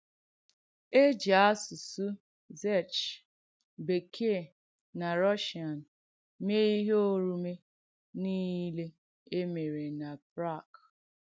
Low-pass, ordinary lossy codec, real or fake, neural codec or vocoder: none; none; real; none